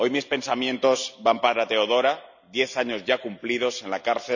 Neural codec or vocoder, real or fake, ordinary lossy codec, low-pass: none; real; none; 7.2 kHz